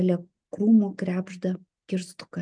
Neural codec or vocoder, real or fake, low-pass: none; real; 9.9 kHz